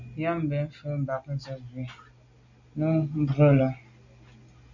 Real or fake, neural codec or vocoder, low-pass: real; none; 7.2 kHz